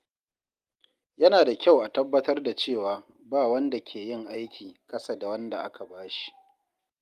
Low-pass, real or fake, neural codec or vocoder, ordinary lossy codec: 14.4 kHz; real; none; Opus, 32 kbps